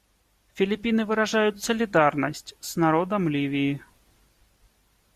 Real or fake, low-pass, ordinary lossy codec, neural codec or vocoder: real; 14.4 kHz; Opus, 64 kbps; none